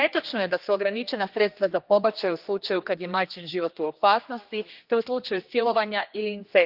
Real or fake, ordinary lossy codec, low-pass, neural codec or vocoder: fake; Opus, 24 kbps; 5.4 kHz; codec, 16 kHz, 2 kbps, X-Codec, HuBERT features, trained on general audio